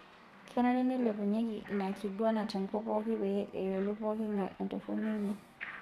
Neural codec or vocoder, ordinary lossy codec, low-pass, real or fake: codec, 32 kHz, 1.9 kbps, SNAC; none; 14.4 kHz; fake